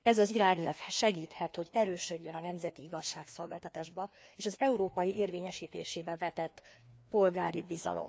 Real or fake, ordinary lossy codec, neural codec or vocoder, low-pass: fake; none; codec, 16 kHz, 2 kbps, FreqCodec, larger model; none